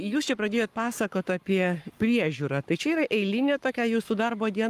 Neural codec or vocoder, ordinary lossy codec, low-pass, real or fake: codec, 44.1 kHz, 7.8 kbps, Pupu-Codec; Opus, 32 kbps; 14.4 kHz; fake